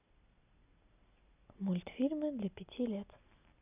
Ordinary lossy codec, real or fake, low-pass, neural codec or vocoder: none; real; 3.6 kHz; none